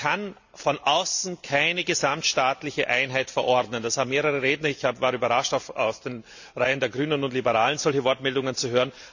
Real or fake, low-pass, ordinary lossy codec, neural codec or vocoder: real; 7.2 kHz; none; none